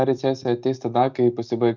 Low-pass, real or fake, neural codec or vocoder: 7.2 kHz; real; none